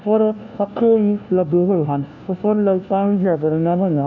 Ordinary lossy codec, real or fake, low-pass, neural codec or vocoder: none; fake; 7.2 kHz; codec, 16 kHz, 1 kbps, FunCodec, trained on LibriTTS, 50 frames a second